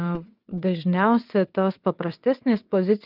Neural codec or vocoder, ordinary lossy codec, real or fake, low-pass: vocoder, 22.05 kHz, 80 mel bands, WaveNeXt; Opus, 24 kbps; fake; 5.4 kHz